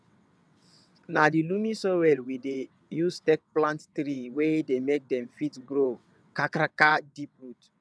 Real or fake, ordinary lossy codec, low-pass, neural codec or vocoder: fake; none; none; vocoder, 22.05 kHz, 80 mel bands, WaveNeXt